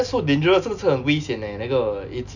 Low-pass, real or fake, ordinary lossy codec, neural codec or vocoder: 7.2 kHz; real; none; none